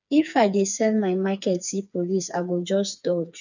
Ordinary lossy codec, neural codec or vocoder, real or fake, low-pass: none; codec, 16 kHz, 4 kbps, FreqCodec, smaller model; fake; 7.2 kHz